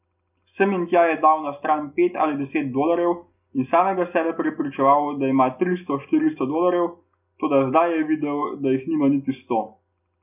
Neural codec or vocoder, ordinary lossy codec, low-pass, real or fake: none; none; 3.6 kHz; real